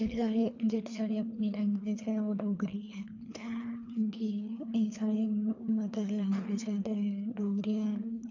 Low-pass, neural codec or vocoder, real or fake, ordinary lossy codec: 7.2 kHz; codec, 24 kHz, 3 kbps, HILCodec; fake; AAC, 48 kbps